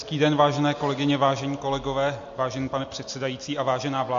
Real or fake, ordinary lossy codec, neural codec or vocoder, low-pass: real; MP3, 48 kbps; none; 7.2 kHz